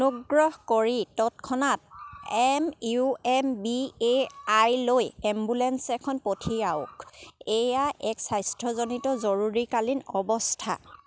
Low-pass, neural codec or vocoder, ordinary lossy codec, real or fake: none; none; none; real